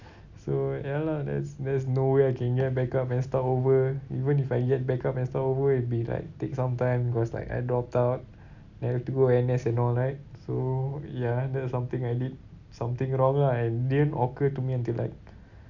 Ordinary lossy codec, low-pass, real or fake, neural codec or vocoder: none; 7.2 kHz; real; none